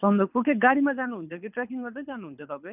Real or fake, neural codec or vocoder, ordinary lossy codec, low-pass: fake; codec, 24 kHz, 6 kbps, HILCodec; none; 3.6 kHz